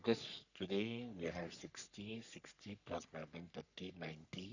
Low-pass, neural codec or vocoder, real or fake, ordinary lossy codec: 7.2 kHz; codec, 44.1 kHz, 3.4 kbps, Pupu-Codec; fake; none